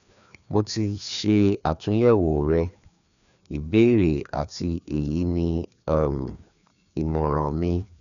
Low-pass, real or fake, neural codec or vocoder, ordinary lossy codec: 7.2 kHz; fake; codec, 16 kHz, 2 kbps, FreqCodec, larger model; none